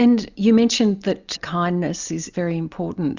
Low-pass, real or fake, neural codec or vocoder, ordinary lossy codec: 7.2 kHz; real; none; Opus, 64 kbps